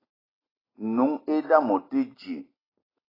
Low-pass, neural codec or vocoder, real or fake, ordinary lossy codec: 5.4 kHz; none; real; AAC, 24 kbps